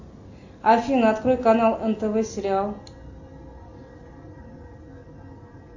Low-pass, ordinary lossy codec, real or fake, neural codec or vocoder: 7.2 kHz; AAC, 48 kbps; real; none